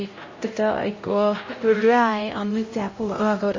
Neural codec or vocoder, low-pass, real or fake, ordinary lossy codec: codec, 16 kHz, 0.5 kbps, X-Codec, HuBERT features, trained on LibriSpeech; 7.2 kHz; fake; MP3, 32 kbps